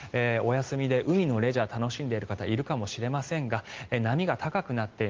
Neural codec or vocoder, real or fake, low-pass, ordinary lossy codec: none; real; 7.2 kHz; Opus, 16 kbps